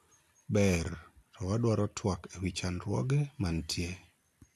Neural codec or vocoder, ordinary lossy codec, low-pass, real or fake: none; AAC, 64 kbps; 14.4 kHz; real